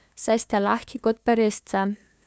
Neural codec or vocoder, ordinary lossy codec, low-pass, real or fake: codec, 16 kHz, 2 kbps, FunCodec, trained on LibriTTS, 25 frames a second; none; none; fake